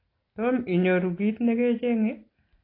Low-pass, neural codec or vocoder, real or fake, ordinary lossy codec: 5.4 kHz; none; real; AAC, 24 kbps